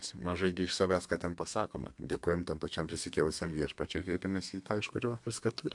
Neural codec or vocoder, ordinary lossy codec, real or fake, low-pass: codec, 32 kHz, 1.9 kbps, SNAC; AAC, 64 kbps; fake; 10.8 kHz